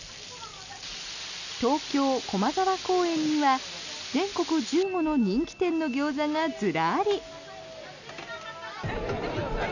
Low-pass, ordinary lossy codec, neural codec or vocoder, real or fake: 7.2 kHz; none; none; real